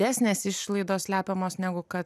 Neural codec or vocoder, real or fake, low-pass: none; real; 14.4 kHz